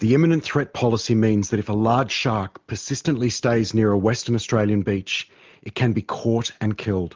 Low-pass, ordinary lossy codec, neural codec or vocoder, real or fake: 7.2 kHz; Opus, 32 kbps; none; real